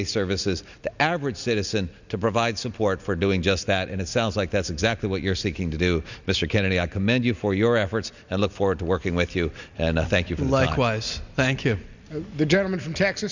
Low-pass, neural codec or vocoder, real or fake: 7.2 kHz; none; real